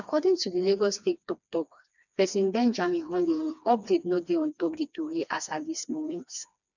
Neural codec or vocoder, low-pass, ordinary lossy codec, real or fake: codec, 16 kHz, 2 kbps, FreqCodec, smaller model; 7.2 kHz; none; fake